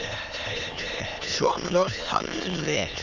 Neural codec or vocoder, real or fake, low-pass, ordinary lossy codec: autoencoder, 22.05 kHz, a latent of 192 numbers a frame, VITS, trained on many speakers; fake; 7.2 kHz; none